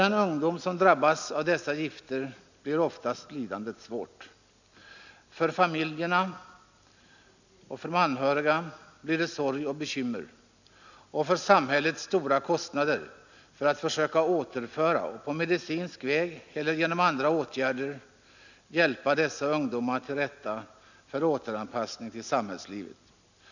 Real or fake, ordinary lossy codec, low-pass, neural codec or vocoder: real; none; 7.2 kHz; none